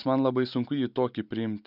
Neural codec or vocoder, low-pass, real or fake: none; 5.4 kHz; real